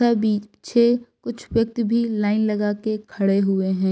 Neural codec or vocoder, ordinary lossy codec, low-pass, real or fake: none; none; none; real